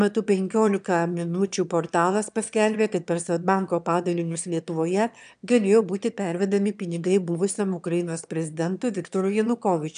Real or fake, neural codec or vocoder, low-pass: fake; autoencoder, 22.05 kHz, a latent of 192 numbers a frame, VITS, trained on one speaker; 9.9 kHz